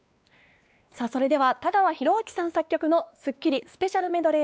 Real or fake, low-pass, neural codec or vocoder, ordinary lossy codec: fake; none; codec, 16 kHz, 2 kbps, X-Codec, WavLM features, trained on Multilingual LibriSpeech; none